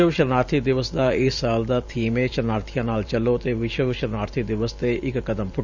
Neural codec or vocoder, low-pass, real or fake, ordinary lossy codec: vocoder, 44.1 kHz, 128 mel bands every 512 samples, BigVGAN v2; 7.2 kHz; fake; Opus, 64 kbps